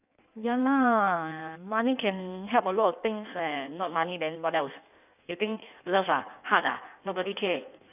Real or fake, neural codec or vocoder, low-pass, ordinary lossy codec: fake; codec, 16 kHz in and 24 kHz out, 1.1 kbps, FireRedTTS-2 codec; 3.6 kHz; none